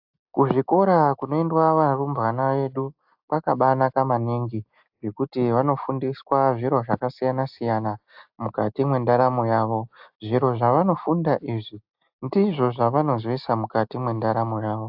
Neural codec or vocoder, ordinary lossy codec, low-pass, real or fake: none; AAC, 48 kbps; 5.4 kHz; real